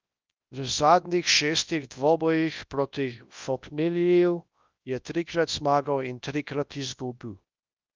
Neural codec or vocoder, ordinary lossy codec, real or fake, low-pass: codec, 24 kHz, 0.9 kbps, WavTokenizer, large speech release; Opus, 24 kbps; fake; 7.2 kHz